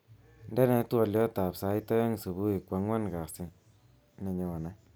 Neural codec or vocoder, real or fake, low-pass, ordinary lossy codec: none; real; none; none